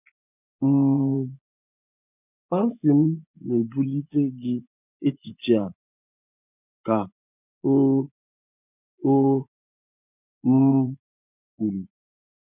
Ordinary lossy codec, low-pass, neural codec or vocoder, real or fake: none; 3.6 kHz; vocoder, 24 kHz, 100 mel bands, Vocos; fake